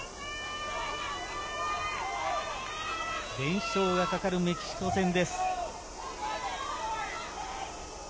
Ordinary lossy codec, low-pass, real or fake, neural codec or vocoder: none; none; real; none